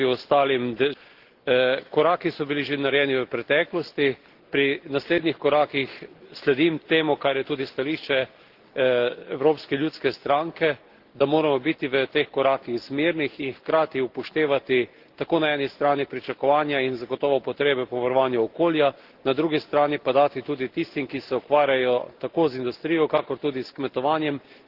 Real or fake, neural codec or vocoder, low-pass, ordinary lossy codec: real; none; 5.4 kHz; Opus, 16 kbps